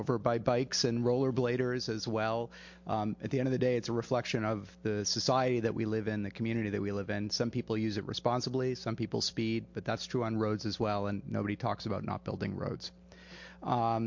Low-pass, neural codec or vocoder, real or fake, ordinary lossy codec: 7.2 kHz; none; real; MP3, 48 kbps